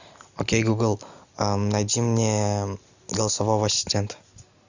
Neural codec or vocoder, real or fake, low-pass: none; real; 7.2 kHz